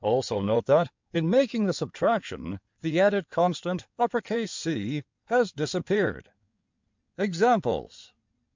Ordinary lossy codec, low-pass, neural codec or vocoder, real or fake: MP3, 64 kbps; 7.2 kHz; codec, 16 kHz in and 24 kHz out, 2.2 kbps, FireRedTTS-2 codec; fake